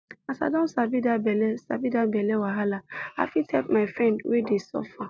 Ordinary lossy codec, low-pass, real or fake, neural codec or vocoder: none; none; real; none